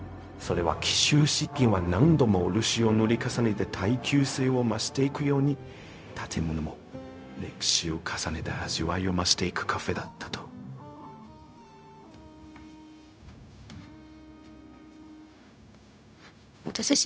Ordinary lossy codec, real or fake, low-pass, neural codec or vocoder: none; fake; none; codec, 16 kHz, 0.4 kbps, LongCat-Audio-Codec